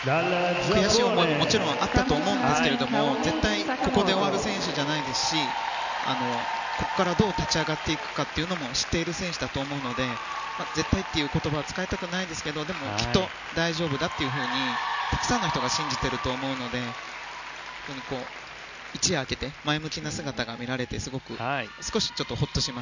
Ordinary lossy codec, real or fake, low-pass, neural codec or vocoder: none; real; 7.2 kHz; none